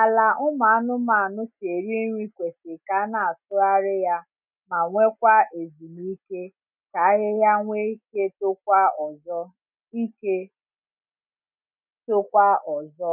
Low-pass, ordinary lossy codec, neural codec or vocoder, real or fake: 3.6 kHz; none; none; real